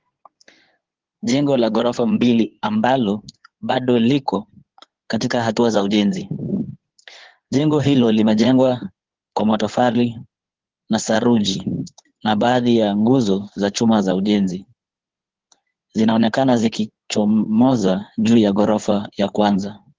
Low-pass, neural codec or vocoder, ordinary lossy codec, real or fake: 7.2 kHz; codec, 16 kHz in and 24 kHz out, 2.2 kbps, FireRedTTS-2 codec; Opus, 16 kbps; fake